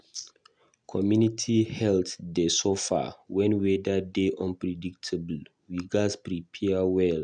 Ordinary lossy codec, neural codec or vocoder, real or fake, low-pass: none; none; real; 9.9 kHz